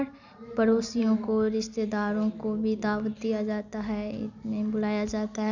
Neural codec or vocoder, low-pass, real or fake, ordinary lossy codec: none; 7.2 kHz; real; none